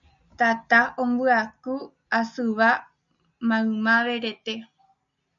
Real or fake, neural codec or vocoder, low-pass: real; none; 7.2 kHz